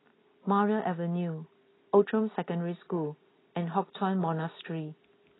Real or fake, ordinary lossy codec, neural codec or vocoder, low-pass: real; AAC, 16 kbps; none; 7.2 kHz